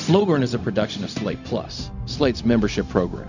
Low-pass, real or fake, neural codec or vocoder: 7.2 kHz; fake; codec, 16 kHz, 0.4 kbps, LongCat-Audio-Codec